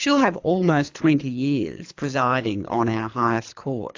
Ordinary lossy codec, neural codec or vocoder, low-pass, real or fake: AAC, 48 kbps; codec, 24 kHz, 3 kbps, HILCodec; 7.2 kHz; fake